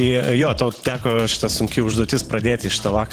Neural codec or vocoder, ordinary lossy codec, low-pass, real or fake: vocoder, 44.1 kHz, 128 mel bands every 512 samples, BigVGAN v2; Opus, 16 kbps; 14.4 kHz; fake